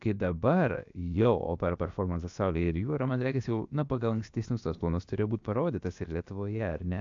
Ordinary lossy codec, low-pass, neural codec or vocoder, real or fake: AAC, 64 kbps; 7.2 kHz; codec, 16 kHz, about 1 kbps, DyCAST, with the encoder's durations; fake